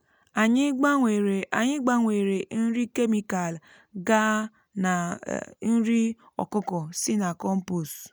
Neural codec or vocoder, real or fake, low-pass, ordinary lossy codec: none; real; none; none